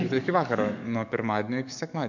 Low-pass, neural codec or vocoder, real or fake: 7.2 kHz; codec, 44.1 kHz, 7.8 kbps, DAC; fake